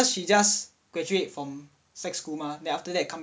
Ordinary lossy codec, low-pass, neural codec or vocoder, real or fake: none; none; none; real